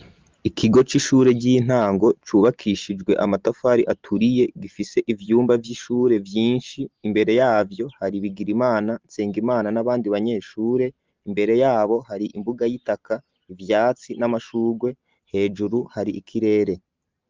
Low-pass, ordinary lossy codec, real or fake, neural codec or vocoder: 7.2 kHz; Opus, 24 kbps; real; none